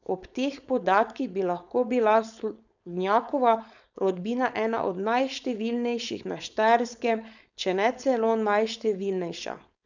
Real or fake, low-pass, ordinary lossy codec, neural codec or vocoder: fake; 7.2 kHz; none; codec, 16 kHz, 4.8 kbps, FACodec